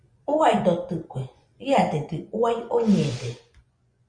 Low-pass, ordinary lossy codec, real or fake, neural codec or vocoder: 9.9 kHz; Opus, 64 kbps; fake; vocoder, 24 kHz, 100 mel bands, Vocos